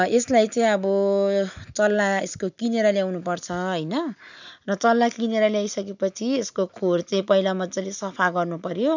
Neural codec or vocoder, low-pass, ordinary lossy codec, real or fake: none; 7.2 kHz; none; real